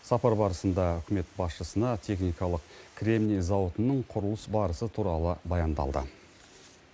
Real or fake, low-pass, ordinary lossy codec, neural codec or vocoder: real; none; none; none